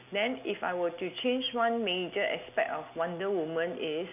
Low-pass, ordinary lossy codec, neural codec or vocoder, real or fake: 3.6 kHz; none; none; real